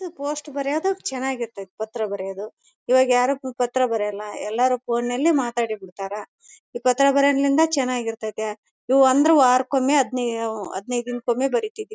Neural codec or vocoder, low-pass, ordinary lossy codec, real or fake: none; none; none; real